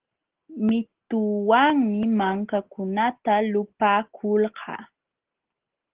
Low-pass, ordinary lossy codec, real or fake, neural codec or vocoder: 3.6 kHz; Opus, 16 kbps; real; none